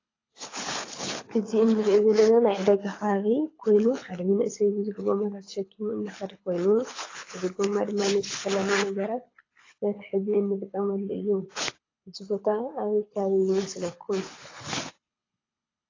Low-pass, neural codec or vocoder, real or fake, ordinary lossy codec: 7.2 kHz; codec, 24 kHz, 6 kbps, HILCodec; fake; AAC, 32 kbps